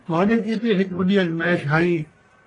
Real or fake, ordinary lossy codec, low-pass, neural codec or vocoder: fake; AAC, 32 kbps; 10.8 kHz; codec, 44.1 kHz, 1.7 kbps, Pupu-Codec